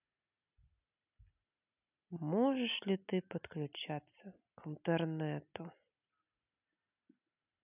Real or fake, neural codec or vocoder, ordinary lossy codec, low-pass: real; none; none; 3.6 kHz